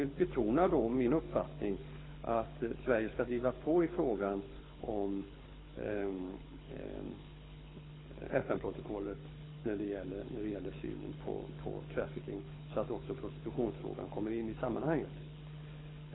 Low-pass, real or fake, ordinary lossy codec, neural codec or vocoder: 7.2 kHz; fake; AAC, 16 kbps; codec, 24 kHz, 6 kbps, HILCodec